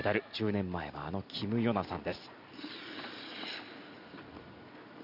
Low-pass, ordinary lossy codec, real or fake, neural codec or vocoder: 5.4 kHz; none; real; none